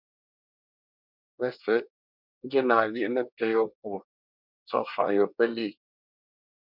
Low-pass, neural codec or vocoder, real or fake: 5.4 kHz; codec, 24 kHz, 1 kbps, SNAC; fake